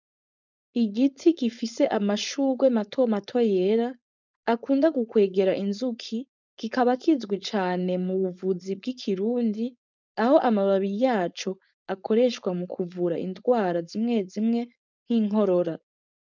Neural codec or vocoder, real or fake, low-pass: codec, 16 kHz, 4.8 kbps, FACodec; fake; 7.2 kHz